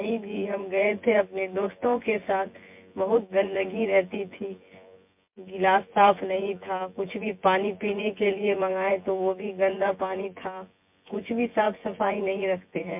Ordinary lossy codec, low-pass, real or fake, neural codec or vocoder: MP3, 32 kbps; 3.6 kHz; fake; vocoder, 24 kHz, 100 mel bands, Vocos